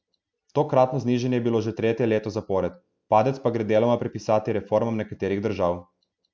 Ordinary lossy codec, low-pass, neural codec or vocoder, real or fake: none; none; none; real